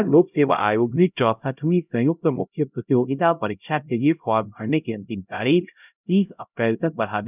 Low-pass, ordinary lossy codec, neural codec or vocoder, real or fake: 3.6 kHz; none; codec, 16 kHz, 0.5 kbps, X-Codec, HuBERT features, trained on LibriSpeech; fake